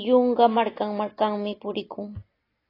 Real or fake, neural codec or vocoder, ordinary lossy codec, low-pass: real; none; AAC, 24 kbps; 5.4 kHz